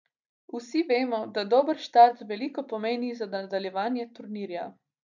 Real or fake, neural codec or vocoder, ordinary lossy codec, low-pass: real; none; none; 7.2 kHz